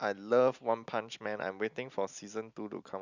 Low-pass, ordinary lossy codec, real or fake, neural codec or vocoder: 7.2 kHz; none; real; none